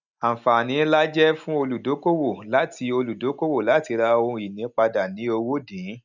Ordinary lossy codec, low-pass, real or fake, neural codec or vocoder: none; 7.2 kHz; real; none